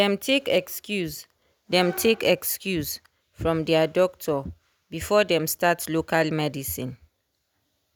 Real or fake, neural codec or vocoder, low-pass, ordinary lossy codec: real; none; none; none